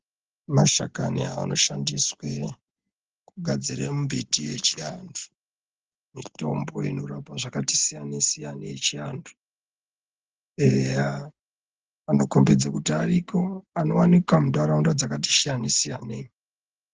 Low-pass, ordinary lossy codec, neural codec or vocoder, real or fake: 7.2 kHz; Opus, 16 kbps; none; real